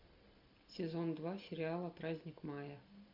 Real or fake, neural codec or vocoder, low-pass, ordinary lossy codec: real; none; 5.4 kHz; MP3, 48 kbps